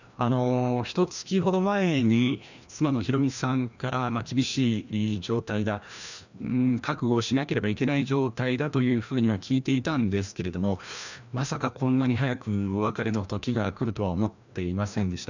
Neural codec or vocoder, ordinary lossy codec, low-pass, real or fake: codec, 16 kHz, 1 kbps, FreqCodec, larger model; none; 7.2 kHz; fake